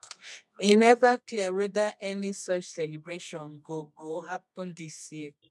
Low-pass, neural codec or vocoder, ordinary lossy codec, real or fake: none; codec, 24 kHz, 0.9 kbps, WavTokenizer, medium music audio release; none; fake